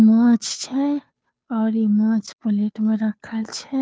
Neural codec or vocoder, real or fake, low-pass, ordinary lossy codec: codec, 16 kHz, 2 kbps, FunCodec, trained on Chinese and English, 25 frames a second; fake; none; none